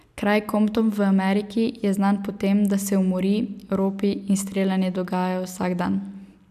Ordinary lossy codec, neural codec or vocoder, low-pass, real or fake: none; none; 14.4 kHz; real